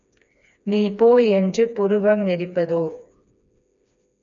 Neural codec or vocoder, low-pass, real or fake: codec, 16 kHz, 2 kbps, FreqCodec, smaller model; 7.2 kHz; fake